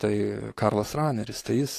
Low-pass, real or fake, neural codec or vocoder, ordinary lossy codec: 14.4 kHz; fake; codec, 44.1 kHz, 7.8 kbps, DAC; AAC, 48 kbps